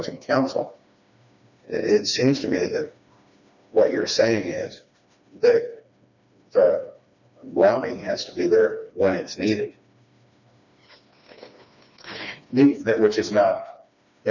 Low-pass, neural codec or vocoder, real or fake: 7.2 kHz; codec, 16 kHz, 2 kbps, FreqCodec, smaller model; fake